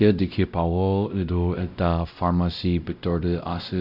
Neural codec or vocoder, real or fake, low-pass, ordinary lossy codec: codec, 16 kHz, 0.5 kbps, X-Codec, WavLM features, trained on Multilingual LibriSpeech; fake; 5.4 kHz; none